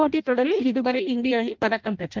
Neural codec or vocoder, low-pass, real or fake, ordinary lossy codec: codec, 16 kHz in and 24 kHz out, 0.6 kbps, FireRedTTS-2 codec; 7.2 kHz; fake; Opus, 24 kbps